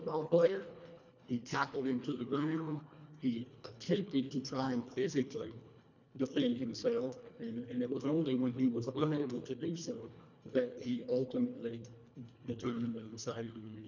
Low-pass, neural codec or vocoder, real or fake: 7.2 kHz; codec, 24 kHz, 1.5 kbps, HILCodec; fake